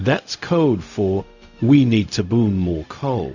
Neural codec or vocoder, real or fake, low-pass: codec, 16 kHz, 0.4 kbps, LongCat-Audio-Codec; fake; 7.2 kHz